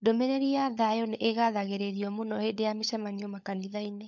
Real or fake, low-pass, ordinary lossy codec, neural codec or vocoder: fake; 7.2 kHz; MP3, 64 kbps; codec, 16 kHz, 16 kbps, FunCodec, trained on LibriTTS, 50 frames a second